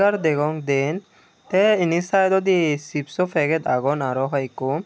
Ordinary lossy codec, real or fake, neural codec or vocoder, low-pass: none; real; none; none